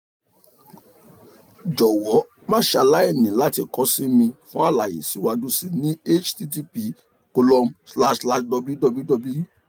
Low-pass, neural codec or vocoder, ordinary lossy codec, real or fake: none; none; none; real